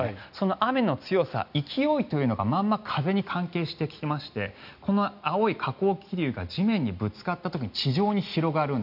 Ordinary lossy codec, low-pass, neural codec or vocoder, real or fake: none; 5.4 kHz; none; real